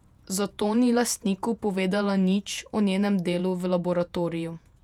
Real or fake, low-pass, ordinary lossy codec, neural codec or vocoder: fake; 19.8 kHz; none; vocoder, 48 kHz, 128 mel bands, Vocos